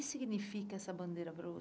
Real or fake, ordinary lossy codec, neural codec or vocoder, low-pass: real; none; none; none